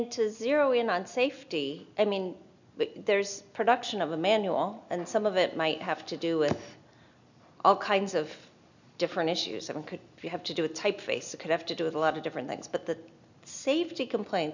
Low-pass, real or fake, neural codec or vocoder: 7.2 kHz; real; none